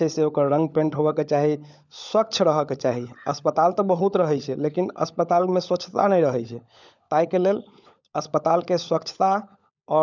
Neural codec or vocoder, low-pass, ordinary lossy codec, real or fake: codec, 16 kHz, 16 kbps, FunCodec, trained on LibriTTS, 50 frames a second; 7.2 kHz; none; fake